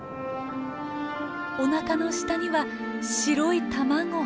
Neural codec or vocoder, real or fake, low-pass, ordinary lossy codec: none; real; none; none